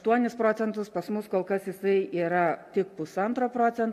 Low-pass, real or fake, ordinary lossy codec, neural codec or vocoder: 14.4 kHz; real; MP3, 64 kbps; none